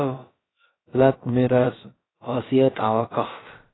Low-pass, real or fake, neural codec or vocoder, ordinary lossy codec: 7.2 kHz; fake; codec, 16 kHz, about 1 kbps, DyCAST, with the encoder's durations; AAC, 16 kbps